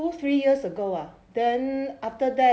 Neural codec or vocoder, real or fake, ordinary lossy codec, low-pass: none; real; none; none